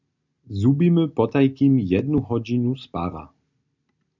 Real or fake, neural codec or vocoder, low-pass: real; none; 7.2 kHz